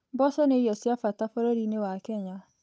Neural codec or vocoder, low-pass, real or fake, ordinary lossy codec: codec, 16 kHz, 8 kbps, FunCodec, trained on Chinese and English, 25 frames a second; none; fake; none